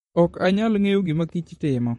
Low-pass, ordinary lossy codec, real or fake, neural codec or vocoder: 19.8 kHz; MP3, 48 kbps; fake; vocoder, 44.1 kHz, 128 mel bands, Pupu-Vocoder